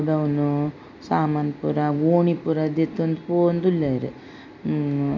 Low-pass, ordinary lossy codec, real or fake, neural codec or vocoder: 7.2 kHz; MP3, 48 kbps; real; none